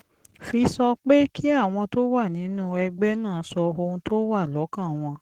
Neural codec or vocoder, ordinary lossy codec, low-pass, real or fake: codec, 44.1 kHz, 7.8 kbps, Pupu-Codec; Opus, 16 kbps; 19.8 kHz; fake